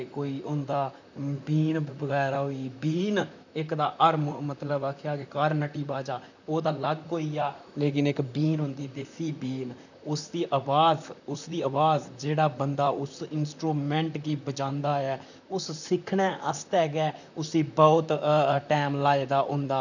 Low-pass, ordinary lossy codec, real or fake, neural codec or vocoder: 7.2 kHz; none; fake; vocoder, 44.1 kHz, 128 mel bands, Pupu-Vocoder